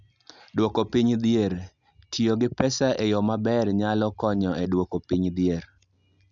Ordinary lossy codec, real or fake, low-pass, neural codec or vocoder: none; real; 7.2 kHz; none